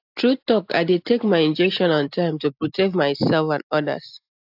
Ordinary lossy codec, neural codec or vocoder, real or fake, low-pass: AAC, 48 kbps; none; real; 5.4 kHz